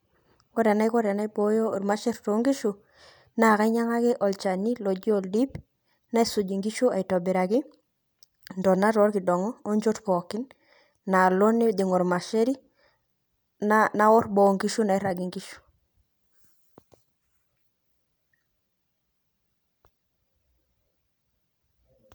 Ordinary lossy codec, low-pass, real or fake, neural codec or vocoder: none; none; real; none